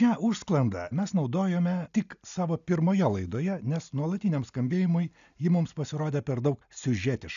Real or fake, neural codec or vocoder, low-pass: real; none; 7.2 kHz